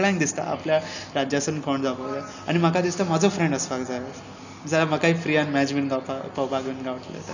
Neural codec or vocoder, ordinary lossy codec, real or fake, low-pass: none; none; real; 7.2 kHz